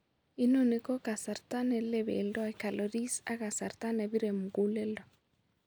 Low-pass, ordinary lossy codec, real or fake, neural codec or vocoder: none; none; real; none